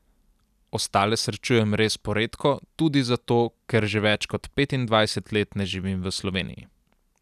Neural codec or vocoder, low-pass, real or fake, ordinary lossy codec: none; 14.4 kHz; real; none